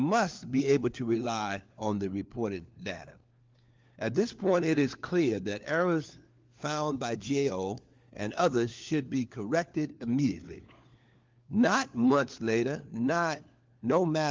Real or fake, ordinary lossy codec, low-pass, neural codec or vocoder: fake; Opus, 32 kbps; 7.2 kHz; codec, 16 kHz, 4 kbps, FunCodec, trained on LibriTTS, 50 frames a second